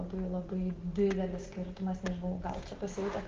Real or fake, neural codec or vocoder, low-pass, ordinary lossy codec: real; none; 7.2 kHz; Opus, 16 kbps